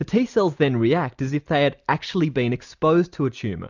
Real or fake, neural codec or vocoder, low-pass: real; none; 7.2 kHz